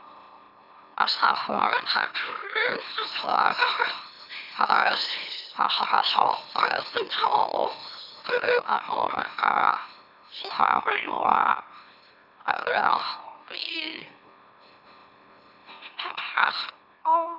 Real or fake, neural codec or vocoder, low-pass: fake; autoencoder, 44.1 kHz, a latent of 192 numbers a frame, MeloTTS; 5.4 kHz